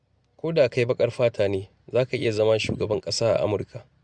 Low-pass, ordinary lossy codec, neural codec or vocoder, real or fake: 9.9 kHz; Opus, 64 kbps; none; real